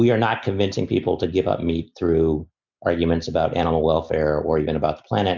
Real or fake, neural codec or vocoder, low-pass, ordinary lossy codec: real; none; 7.2 kHz; MP3, 64 kbps